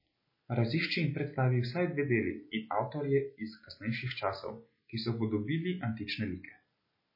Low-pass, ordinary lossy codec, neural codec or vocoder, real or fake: 5.4 kHz; MP3, 24 kbps; none; real